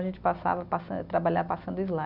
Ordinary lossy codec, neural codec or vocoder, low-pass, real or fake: none; none; 5.4 kHz; real